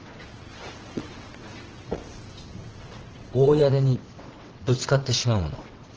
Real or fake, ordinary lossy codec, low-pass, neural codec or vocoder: fake; Opus, 16 kbps; 7.2 kHz; vocoder, 22.05 kHz, 80 mel bands, WaveNeXt